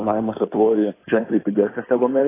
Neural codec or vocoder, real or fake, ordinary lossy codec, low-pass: codec, 16 kHz in and 24 kHz out, 2.2 kbps, FireRedTTS-2 codec; fake; AAC, 16 kbps; 3.6 kHz